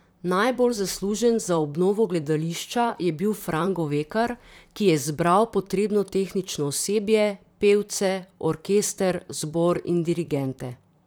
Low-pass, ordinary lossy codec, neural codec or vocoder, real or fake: none; none; vocoder, 44.1 kHz, 128 mel bands, Pupu-Vocoder; fake